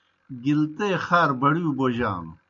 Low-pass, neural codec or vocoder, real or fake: 7.2 kHz; none; real